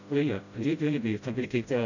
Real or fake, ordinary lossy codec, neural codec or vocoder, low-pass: fake; none; codec, 16 kHz, 0.5 kbps, FreqCodec, smaller model; 7.2 kHz